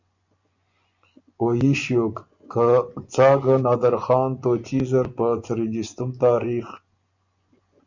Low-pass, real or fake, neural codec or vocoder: 7.2 kHz; fake; vocoder, 24 kHz, 100 mel bands, Vocos